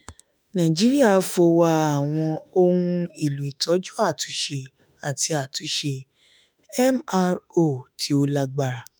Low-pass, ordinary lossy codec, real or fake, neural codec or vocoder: none; none; fake; autoencoder, 48 kHz, 32 numbers a frame, DAC-VAE, trained on Japanese speech